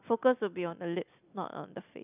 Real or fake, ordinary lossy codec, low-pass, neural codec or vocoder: real; none; 3.6 kHz; none